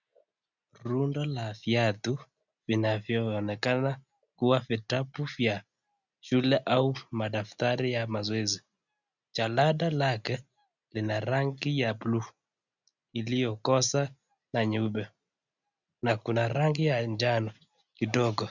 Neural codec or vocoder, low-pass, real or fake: none; 7.2 kHz; real